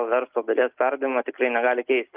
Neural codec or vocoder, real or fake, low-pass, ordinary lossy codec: none; real; 3.6 kHz; Opus, 32 kbps